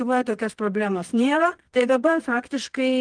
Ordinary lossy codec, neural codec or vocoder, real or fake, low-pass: Opus, 32 kbps; codec, 24 kHz, 0.9 kbps, WavTokenizer, medium music audio release; fake; 9.9 kHz